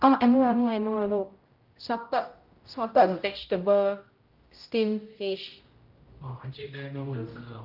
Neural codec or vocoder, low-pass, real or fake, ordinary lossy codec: codec, 16 kHz, 0.5 kbps, X-Codec, HuBERT features, trained on general audio; 5.4 kHz; fake; Opus, 32 kbps